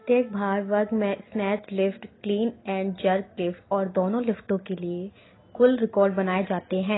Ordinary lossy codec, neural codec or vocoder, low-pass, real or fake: AAC, 16 kbps; none; 7.2 kHz; real